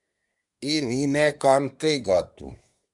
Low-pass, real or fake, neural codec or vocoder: 10.8 kHz; fake; codec, 24 kHz, 1 kbps, SNAC